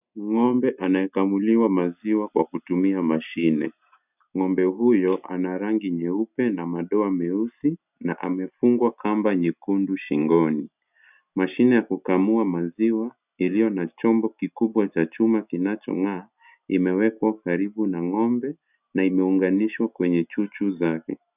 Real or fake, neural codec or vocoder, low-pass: real; none; 3.6 kHz